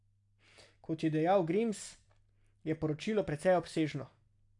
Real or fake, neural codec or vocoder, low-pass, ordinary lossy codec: real; none; 10.8 kHz; AAC, 64 kbps